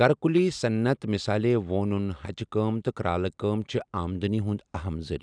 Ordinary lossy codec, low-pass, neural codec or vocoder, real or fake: none; 9.9 kHz; none; real